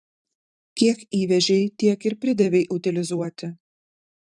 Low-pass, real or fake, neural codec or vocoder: 10.8 kHz; fake; vocoder, 44.1 kHz, 128 mel bands every 512 samples, BigVGAN v2